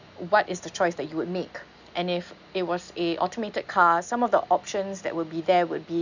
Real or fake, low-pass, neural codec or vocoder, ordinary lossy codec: real; 7.2 kHz; none; none